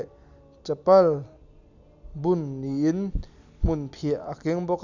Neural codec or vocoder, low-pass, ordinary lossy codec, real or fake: none; 7.2 kHz; none; real